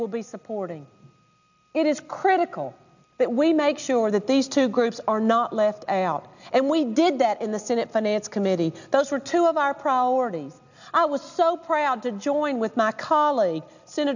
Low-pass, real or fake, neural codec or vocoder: 7.2 kHz; real; none